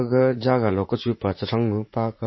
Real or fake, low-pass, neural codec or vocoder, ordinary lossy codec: fake; 7.2 kHz; vocoder, 44.1 kHz, 80 mel bands, Vocos; MP3, 24 kbps